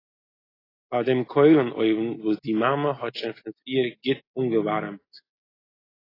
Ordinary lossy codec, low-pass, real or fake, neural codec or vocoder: AAC, 24 kbps; 5.4 kHz; real; none